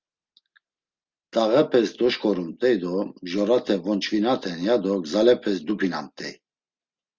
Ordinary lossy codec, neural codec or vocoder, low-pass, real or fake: Opus, 32 kbps; none; 7.2 kHz; real